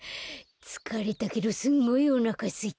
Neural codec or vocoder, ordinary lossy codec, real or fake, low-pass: none; none; real; none